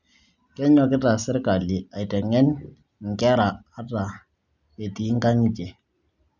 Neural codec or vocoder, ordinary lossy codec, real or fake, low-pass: none; none; real; 7.2 kHz